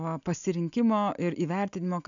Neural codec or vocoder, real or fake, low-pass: none; real; 7.2 kHz